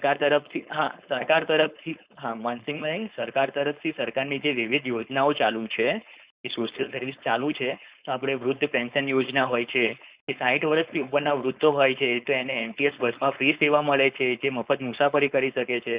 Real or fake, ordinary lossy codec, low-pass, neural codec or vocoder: fake; Opus, 64 kbps; 3.6 kHz; codec, 16 kHz, 4.8 kbps, FACodec